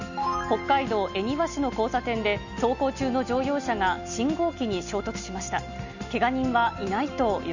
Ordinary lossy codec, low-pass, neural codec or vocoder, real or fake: none; 7.2 kHz; none; real